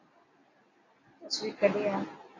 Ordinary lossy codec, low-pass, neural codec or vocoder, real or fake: AAC, 32 kbps; 7.2 kHz; none; real